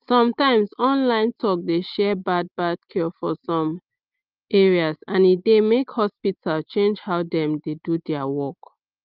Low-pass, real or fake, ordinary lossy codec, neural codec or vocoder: 5.4 kHz; real; Opus, 24 kbps; none